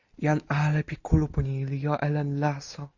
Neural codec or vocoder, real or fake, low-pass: none; real; 7.2 kHz